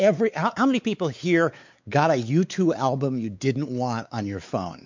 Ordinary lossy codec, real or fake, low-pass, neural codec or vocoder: MP3, 64 kbps; fake; 7.2 kHz; codec, 16 kHz, 4 kbps, X-Codec, WavLM features, trained on Multilingual LibriSpeech